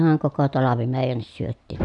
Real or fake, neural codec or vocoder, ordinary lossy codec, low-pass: real; none; none; 10.8 kHz